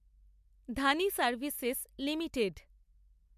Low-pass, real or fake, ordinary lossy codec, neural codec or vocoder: 14.4 kHz; real; MP3, 96 kbps; none